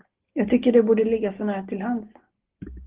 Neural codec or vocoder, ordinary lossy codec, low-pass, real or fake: none; Opus, 16 kbps; 3.6 kHz; real